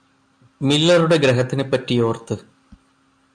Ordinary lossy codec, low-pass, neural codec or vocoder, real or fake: MP3, 96 kbps; 9.9 kHz; none; real